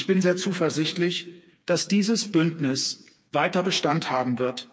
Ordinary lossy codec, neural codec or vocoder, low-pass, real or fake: none; codec, 16 kHz, 4 kbps, FreqCodec, smaller model; none; fake